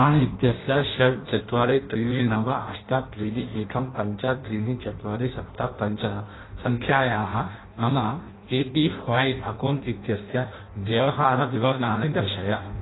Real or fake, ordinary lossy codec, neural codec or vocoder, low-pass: fake; AAC, 16 kbps; codec, 16 kHz in and 24 kHz out, 0.6 kbps, FireRedTTS-2 codec; 7.2 kHz